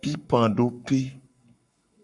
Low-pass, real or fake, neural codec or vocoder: 10.8 kHz; fake; codec, 44.1 kHz, 7.8 kbps, Pupu-Codec